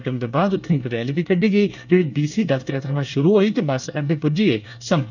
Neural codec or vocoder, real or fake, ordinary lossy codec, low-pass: codec, 24 kHz, 1 kbps, SNAC; fake; none; 7.2 kHz